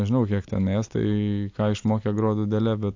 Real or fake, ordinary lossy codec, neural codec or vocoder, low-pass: real; AAC, 48 kbps; none; 7.2 kHz